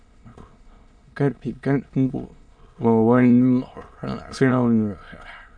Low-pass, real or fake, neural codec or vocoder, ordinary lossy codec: 9.9 kHz; fake; autoencoder, 22.05 kHz, a latent of 192 numbers a frame, VITS, trained on many speakers; AAC, 64 kbps